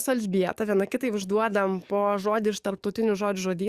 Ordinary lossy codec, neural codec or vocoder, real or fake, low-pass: Opus, 64 kbps; codec, 44.1 kHz, 7.8 kbps, DAC; fake; 14.4 kHz